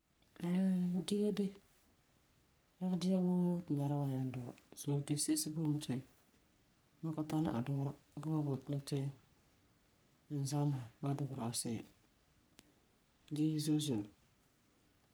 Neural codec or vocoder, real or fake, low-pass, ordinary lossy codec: codec, 44.1 kHz, 3.4 kbps, Pupu-Codec; fake; none; none